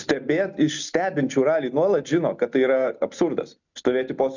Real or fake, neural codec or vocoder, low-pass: real; none; 7.2 kHz